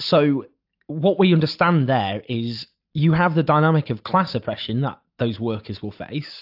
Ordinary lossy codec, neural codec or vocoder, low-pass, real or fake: AAC, 48 kbps; none; 5.4 kHz; real